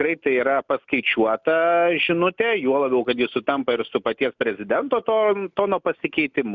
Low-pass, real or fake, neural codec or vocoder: 7.2 kHz; real; none